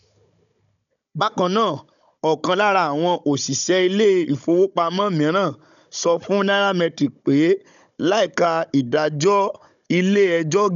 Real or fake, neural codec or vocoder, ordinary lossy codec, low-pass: fake; codec, 16 kHz, 16 kbps, FunCodec, trained on Chinese and English, 50 frames a second; none; 7.2 kHz